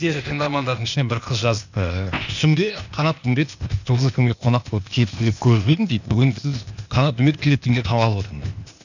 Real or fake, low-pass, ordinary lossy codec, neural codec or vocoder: fake; 7.2 kHz; none; codec, 16 kHz, 0.8 kbps, ZipCodec